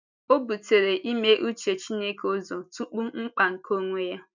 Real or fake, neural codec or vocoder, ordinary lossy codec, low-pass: real; none; none; 7.2 kHz